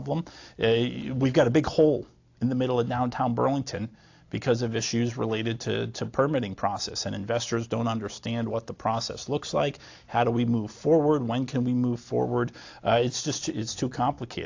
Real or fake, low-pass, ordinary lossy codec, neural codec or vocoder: real; 7.2 kHz; AAC, 48 kbps; none